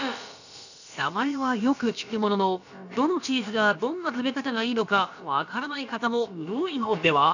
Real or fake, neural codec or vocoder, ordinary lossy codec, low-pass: fake; codec, 16 kHz, about 1 kbps, DyCAST, with the encoder's durations; none; 7.2 kHz